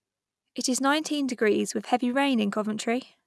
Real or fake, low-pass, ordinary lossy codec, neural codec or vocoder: real; none; none; none